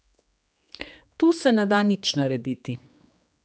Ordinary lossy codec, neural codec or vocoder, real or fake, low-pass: none; codec, 16 kHz, 2 kbps, X-Codec, HuBERT features, trained on general audio; fake; none